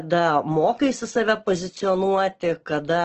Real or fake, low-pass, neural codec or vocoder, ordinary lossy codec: real; 7.2 kHz; none; Opus, 16 kbps